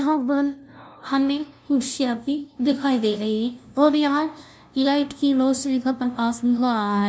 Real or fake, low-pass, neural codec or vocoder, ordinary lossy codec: fake; none; codec, 16 kHz, 0.5 kbps, FunCodec, trained on LibriTTS, 25 frames a second; none